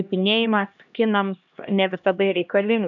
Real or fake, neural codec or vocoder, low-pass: fake; codec, 16 kHz, 1 kbps, X-Codec, HuBERT features, trained on LibriSpeech; 7.2 kHz